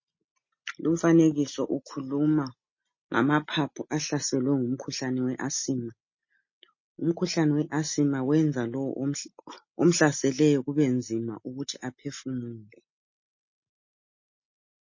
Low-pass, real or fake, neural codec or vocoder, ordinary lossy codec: 7.2 kHz; real; none; MP3, 32 kbps